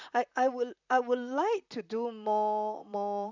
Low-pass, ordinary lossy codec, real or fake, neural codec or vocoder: 7.2 kHz; none; fake; vocoder, 44.1 kHz, 128 mel bands, Pupu-Vocoder